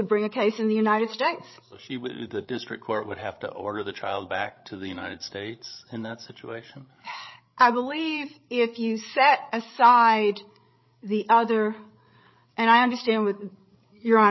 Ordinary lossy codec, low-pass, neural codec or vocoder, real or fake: MP3, 24 kbps; 7.2 kHz; codec, 16 kHz, 8 kbps, FreqCodec, larger model; fake